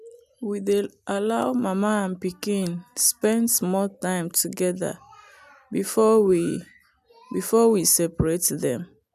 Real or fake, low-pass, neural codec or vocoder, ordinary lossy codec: real; 14.4 kHz; none; none